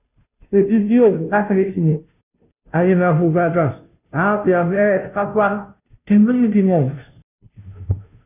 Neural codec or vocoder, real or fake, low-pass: codec, 16 kHz, 0.5 kbps, FunCodec, trained on Chinese and English, 25 frames a second; fake; 3.6 kHz